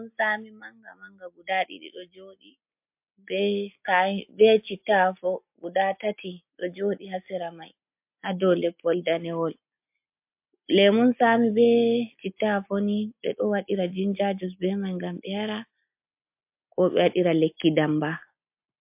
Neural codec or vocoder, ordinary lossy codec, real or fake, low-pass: none; MP3, 32 kbps; real; 3.6 kHz